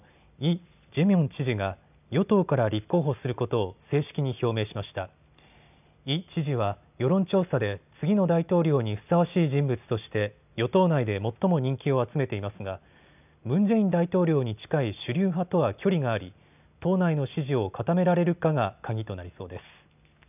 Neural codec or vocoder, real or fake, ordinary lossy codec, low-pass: none; real; none; 3.6 kHz